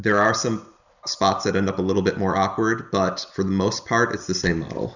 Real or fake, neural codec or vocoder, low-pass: real; none; 7.2 kHz